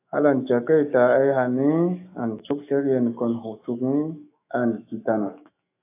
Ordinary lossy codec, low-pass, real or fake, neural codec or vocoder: AAC, 24 kbps; 3.6 kHz; fake; autoencoder, 48 kHz, 128 numbers a frame, DAC-VAE, trained on Japanese speech